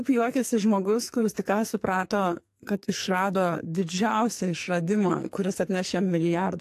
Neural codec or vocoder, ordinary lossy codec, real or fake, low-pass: codec, 44.1 kHz, 2.6 kbps, SNAC; AAC, 64 kbps; fake; 14.4 kHz